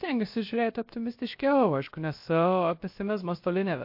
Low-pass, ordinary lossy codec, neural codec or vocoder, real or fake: 5.4 kHz; MP3, 32 kbps; codec, 16 kHz, about 1 kbps, DyCAST, with the encoder's durations; fake